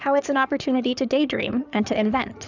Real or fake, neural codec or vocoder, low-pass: fake; codec, 16 kHz in and 24 kHz out, 2.2 kbps, FireRedTTS-2 codec; 7.2 kHz